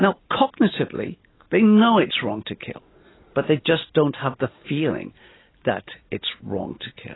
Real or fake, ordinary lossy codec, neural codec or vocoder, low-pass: real; AAC, 16 kbps; none; 7.2 kHz